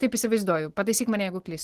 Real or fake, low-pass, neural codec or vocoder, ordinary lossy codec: real; 14.4 kHz; none; Opus, 16 kbps